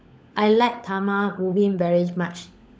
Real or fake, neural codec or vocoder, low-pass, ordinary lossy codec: fake; codec, 16 kHz, 16 kbps, FunCodec, trained on LibriTTS, 50 frames a second; none; none